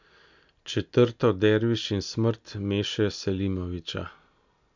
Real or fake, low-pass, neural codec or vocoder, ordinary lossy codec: real; 7.2 kHz; none; none